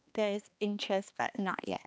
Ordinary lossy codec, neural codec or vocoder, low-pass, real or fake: none; codec, 16 kHz, 2 kbps, X-Codec, HuBERT features, trained on balanced general audio; none; fake